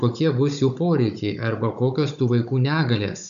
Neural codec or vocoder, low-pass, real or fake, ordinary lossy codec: codec, 16 kHz, 16 kbps, FunCodec, trained on Chinese and English, 50 frames a second; 7.2 kHz; fake; AAC, 96 kbps